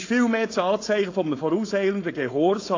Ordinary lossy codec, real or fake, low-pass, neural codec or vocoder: AAC, 32 kbps; fake; 7.2 kHz; codec, 16 kHz, 4.8 kbps, FACodec